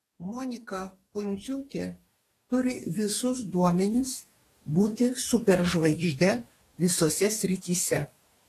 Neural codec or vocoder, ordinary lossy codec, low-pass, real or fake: codec, 44.1 kHz, 2.6 kbps, DAC; AAC, 48 kbps; 14.4 kHz; fake